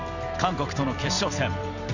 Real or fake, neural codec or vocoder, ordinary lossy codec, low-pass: fake; vocoder, 44.1 kHz, 128 mel bands every 256 samples, BigVGAN v2; none; 7.2 kHz